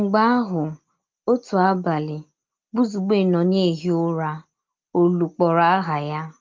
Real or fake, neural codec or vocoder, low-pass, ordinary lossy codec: real; none; 7.2 kHz; Opus, 32 kbps